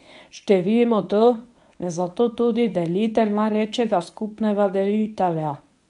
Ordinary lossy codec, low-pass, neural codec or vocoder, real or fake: none; 10.8 kHz; codec, 24 kHz, 0.9 kbps, WavTokenizer, medium speech release version 1; fake